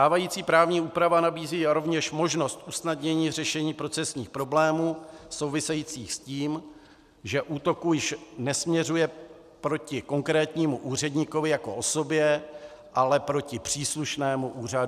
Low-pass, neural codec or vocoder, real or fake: 14.4 kHz; none; real